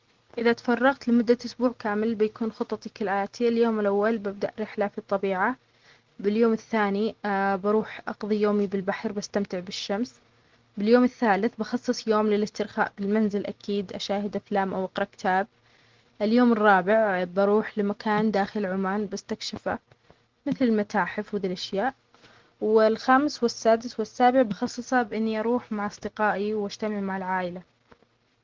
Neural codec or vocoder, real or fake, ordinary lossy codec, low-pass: none; real; Opus, 16 kbps; 7.2 kHz